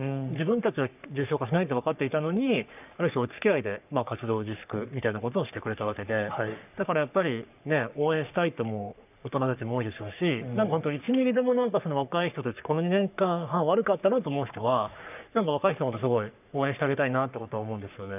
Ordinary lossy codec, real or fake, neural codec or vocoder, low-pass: none; fake; codec, 44.1 kHz, 3.4 kbps, Pupu-Codec; 3.6 kHz